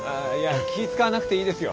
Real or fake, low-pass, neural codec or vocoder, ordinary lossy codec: real; none; none; none